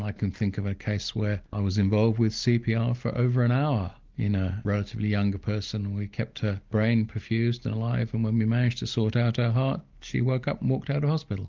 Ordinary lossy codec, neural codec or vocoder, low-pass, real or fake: Opus, 32 kbps; none; 7.2 kHz; real